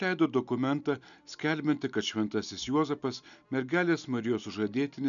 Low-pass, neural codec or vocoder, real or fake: 7.2 kHz; none; real